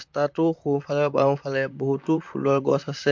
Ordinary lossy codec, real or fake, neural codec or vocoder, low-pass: MP3, 64 kbps; fake; vocoder, 44.1 kHz, 128 mel bands, Pupu-Vocoder; 7.2 kHz